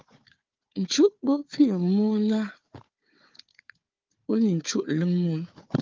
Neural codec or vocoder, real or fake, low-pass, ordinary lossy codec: codec, 16 kHz, 4 kbps, FunCodec, trained on Chinese and English, 50 frames a second; fake; 7.2 kHz; Opus, 32 kbps